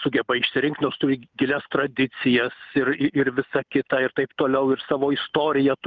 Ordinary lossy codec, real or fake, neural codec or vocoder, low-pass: Opus, 24 kbps; real; none; 7.2 kHz